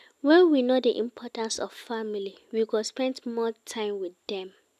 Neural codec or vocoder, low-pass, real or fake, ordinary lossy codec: none; 14.4 kHz; real; none